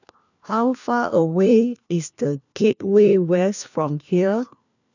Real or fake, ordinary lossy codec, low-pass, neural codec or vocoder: fake; none; 7.2 kHz; codec, 16 kHz, 1 kbps, FunCodec, trained on LibriTTS, 50 frames a second